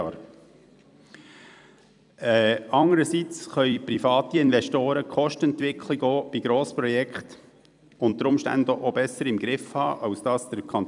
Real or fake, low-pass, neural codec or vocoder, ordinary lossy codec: fake; 10.8 kHz; vocoder, 44.1 kHz, 128 mel bands every 256 samples, BigVGAN v2; none